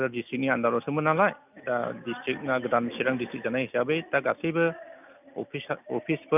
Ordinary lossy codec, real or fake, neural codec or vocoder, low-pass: none; real; none; 3.6 kHz